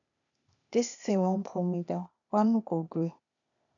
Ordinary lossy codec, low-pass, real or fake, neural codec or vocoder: none; 7.2 kHz; fake; codec, 16 kHz, 0.8 kbps, ZipCodec